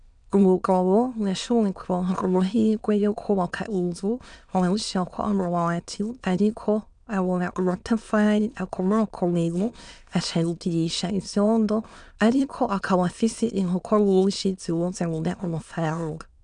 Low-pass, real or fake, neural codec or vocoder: 9.9 kHz; fake; autoencoder, 22.05 kHz, a latent of 192 numbers a frame, VITS, trained on many speakers